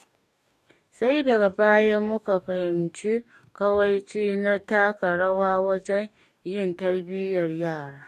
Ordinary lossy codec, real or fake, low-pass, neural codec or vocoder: none; fake; 14.4 kHz; codec, 44.1 kHz, 2.6 kbps, DAC